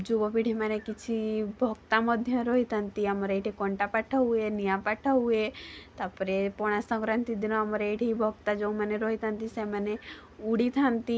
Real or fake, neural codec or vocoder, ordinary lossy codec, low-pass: real; none; none; none